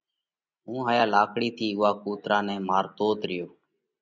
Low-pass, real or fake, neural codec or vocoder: 7.2 kHz; real; none